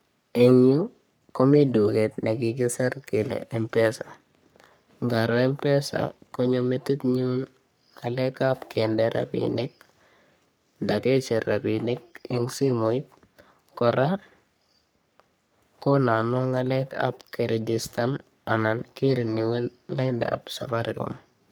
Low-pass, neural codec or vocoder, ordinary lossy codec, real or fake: none; codec, 44.1 kHz, 3.4 kbps, Pupu-Codec; none; fake